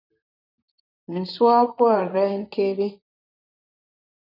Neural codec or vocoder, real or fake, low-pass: vocoder, 44.1 kHz, 128 mel bands, Pupu-Vocoder; fake; 5.4 kHz